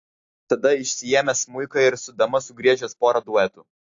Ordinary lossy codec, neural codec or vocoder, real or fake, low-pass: AAC, 48 kbps; none; real; 7.2 kHz